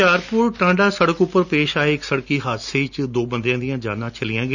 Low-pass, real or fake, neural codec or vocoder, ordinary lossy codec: 7.2 kHz; real; none; none